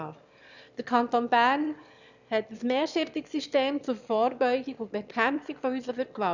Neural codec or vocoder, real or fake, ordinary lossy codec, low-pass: autoencoder, 22.05 kHz, a latent of 192 numbers a frame, VITS, trained on one speaker; fake; none; 7.2 kHz